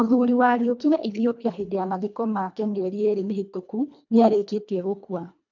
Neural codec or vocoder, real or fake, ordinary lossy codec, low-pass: codec, 24 kHz, 1.5 kbps, HILCodec; fake; none; 7.2 kHz